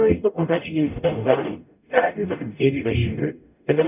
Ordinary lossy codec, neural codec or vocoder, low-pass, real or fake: none; codec, 44.1 kHz, 0.9 kbps, DAC; 3.6 kHz; fake